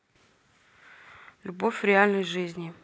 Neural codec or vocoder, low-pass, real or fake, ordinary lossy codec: none; none; real; none